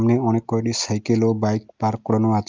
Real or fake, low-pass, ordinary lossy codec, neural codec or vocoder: real; 7.2 kHz; Opus, 24 kbps; none